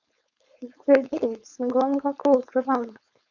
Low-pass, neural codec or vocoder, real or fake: 7.2 kHz; codec, 16 kHz, 4.8 kbps, FACodec; fake